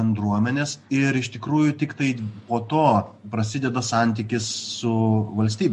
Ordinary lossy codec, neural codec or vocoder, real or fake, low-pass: MP3, 48 kbps; none; real; 14.4 kHz